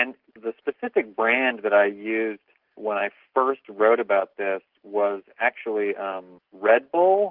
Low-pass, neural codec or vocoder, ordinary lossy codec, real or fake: 5.4 kHz; none; Opus, 32 kbps; real